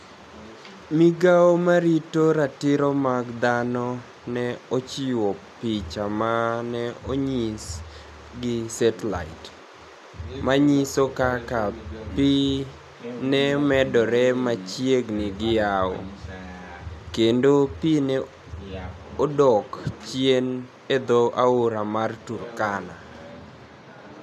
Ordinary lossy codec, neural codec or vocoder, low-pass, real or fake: none; none; 14.4 kHz; real